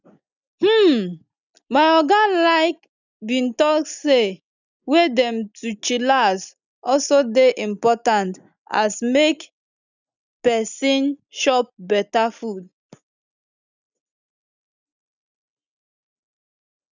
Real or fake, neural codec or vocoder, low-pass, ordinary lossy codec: real; none; 7.2 kHz; none